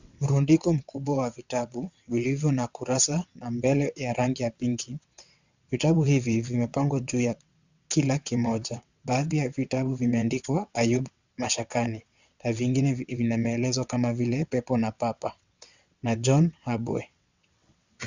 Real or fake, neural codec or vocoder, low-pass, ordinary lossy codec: fake; vocoder, 22.05 kHz, 80 mel bands, WaveNeXt; 7.2 kHz; Opus, 64 kbps